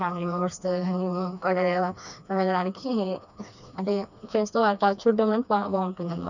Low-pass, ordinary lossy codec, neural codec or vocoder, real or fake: 7.2 kHz; none; codec, 16 kHz, 2 kbps, FreqCodec, smaller model; fake